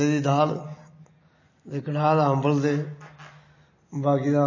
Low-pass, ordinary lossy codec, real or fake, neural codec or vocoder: 7.2 kHz; MP3, 32 kbps; real; none